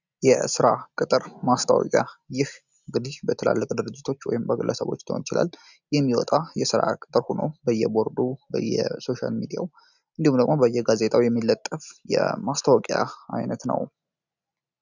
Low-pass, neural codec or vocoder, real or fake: 7.2 kHz; none; real